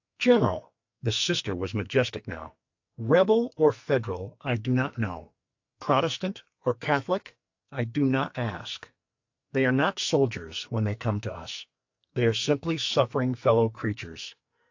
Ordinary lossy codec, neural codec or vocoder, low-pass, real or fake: AAC, 48 kbps; codec, 44.1 kHz, 2.6 kbps, SNAC; 7.2 kHz; fake